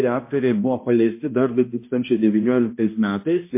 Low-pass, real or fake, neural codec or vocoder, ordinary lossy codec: 3.6 kHz; fake; codec, 16 kHz, 1 kbps, X-Codec, HuBERT features, trained on balanced general audio; MP3, 24 kbps